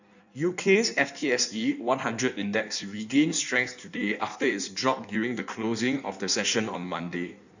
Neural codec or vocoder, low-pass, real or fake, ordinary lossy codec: codec, 16 kHz in and 24 kHz out, 1.1 kbps, FireRedTTS-2 codec; 7.2 kHz; fake; none